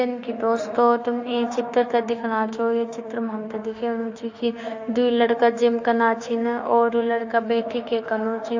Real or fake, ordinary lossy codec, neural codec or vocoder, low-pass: fake; AAC, 48 kbps; autoencoder, 48 kHz, 32 numbers a frame, DAC-VAE, trained on Japanese speech; 7.2 kHz